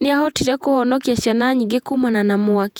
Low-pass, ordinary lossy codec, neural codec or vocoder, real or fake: 19.8 kHz; none; vocoder, 48 kHz, 128 mel bands, Vocos; fake